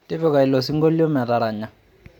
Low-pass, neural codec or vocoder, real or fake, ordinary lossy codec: 19.8 kHz; vocoder, 44.1 kHz, 128 mel bands every 512 samples, BigVGAN v2; fake; MP3, 96 kbps